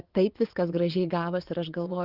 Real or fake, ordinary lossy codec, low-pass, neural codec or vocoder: fake; Opus, 16 kbps; 5.4 kHz; vocoder, 24 kHz, 100 mel bands, Vocos